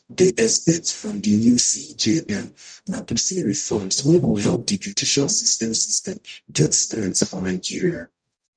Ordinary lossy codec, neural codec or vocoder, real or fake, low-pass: none; codec, 44.1 kHz, 0.9 kbps, DAC; fake; 9.9 kHz